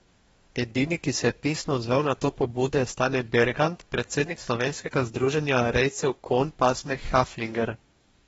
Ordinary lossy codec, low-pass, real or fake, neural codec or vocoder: AAC, 24 kbps; 19.8 kHz; fake; codec, 44.1 kHz, 2.6 kbps, DAC